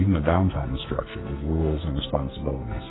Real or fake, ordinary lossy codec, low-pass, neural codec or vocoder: fake; AAC, 16 kbps; 7.2 kHz; codec, 44.1 kHz, 2.6 kbps, SNAC